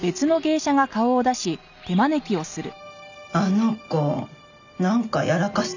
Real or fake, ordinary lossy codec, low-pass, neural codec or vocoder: real; none; 7.2 kHz; none